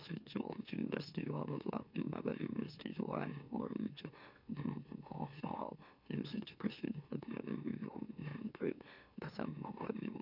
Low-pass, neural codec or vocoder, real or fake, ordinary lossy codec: 5.4 kHz; autoencoder, 44.1 kHz, a latent of 192 numbers a frame, MeloTTS; fake; none